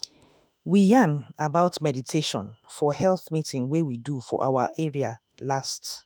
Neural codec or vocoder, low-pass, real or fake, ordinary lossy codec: autoencoder, 48 kHz, 32 numbers a frame, DAC-VAE, trained on Japanese speech; none; fake; none